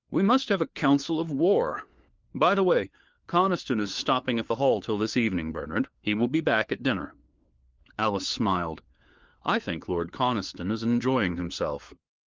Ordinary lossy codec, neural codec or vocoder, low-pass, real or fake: Opus, 32 kbps; codec, 16 kHz, 4 kbps, FunCodec, trained on LibriTTS, 50 frames a second; 7.2 kHz; fake